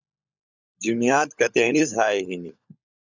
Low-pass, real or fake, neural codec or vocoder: 7.2 kHz; fake; codec, 16 kHz, 16 kbps, FunCodec, trained on LibriTTS, 50 frames a second